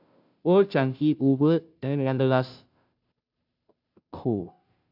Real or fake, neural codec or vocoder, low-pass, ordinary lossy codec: fake; codec, 16 kHz, 0.5 kbps, FunCodec, trained on Chinese and English, 25 frames a second; 5.4 kHz; AAC, 48 kbps